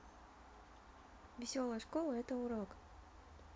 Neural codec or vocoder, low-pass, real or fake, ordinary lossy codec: none; none; real; none